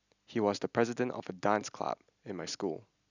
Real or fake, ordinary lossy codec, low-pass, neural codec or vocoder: real; none; 7.2 kHz; none